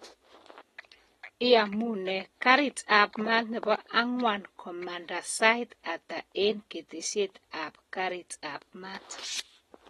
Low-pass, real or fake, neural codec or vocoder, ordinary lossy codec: 19.8 kHz; real; none; AAC, 32 kbps